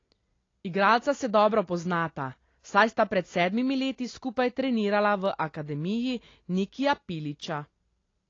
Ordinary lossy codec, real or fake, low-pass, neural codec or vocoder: AAC, 32 kbps; real; 7.2 kHz; none